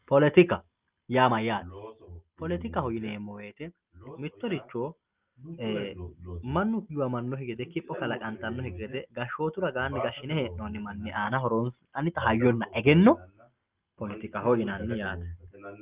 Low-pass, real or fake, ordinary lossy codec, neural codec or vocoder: 3.6 kHz; real; Opus, 24 kbps; none